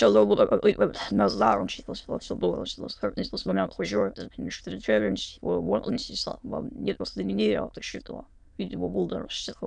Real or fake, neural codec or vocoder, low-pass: fake; autoencoder, 22.05 kHz, a latent of 192 numbers a frame, VITS, trained on many speakers; 9.9 kHz